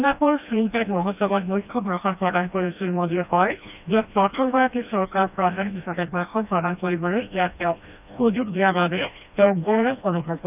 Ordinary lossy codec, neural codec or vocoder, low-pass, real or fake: none; codec, 16 kHz, 1 kbps, FreqCodec, smaller model; 3.6 kHz; fake